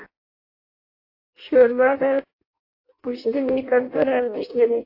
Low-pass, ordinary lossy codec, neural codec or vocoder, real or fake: 5.4 kHz; AAC, 32 kbps; codec, 16 kHz in and 24 kHz out, 0.6 kbps, FireRedTTS-2 codec; fake